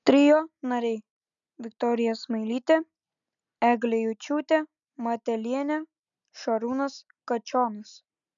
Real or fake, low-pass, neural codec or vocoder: real; 7.2 kHz; none